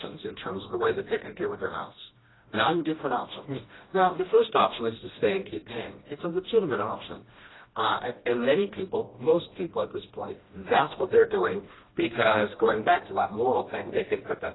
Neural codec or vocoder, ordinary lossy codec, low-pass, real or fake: codec, 16 kHz, 1 kbps, FreqCodec, smaller model; AAC, 16 kbps; 7.2 kHz; fake